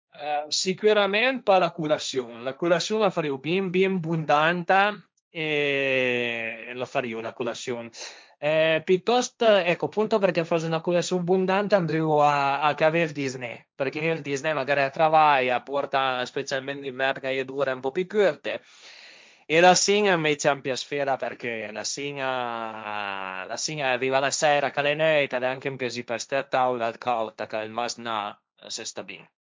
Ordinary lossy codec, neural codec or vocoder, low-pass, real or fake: none; codec, 16 kHz, 1.1 kbps, Voila-Tokenizer; none; fake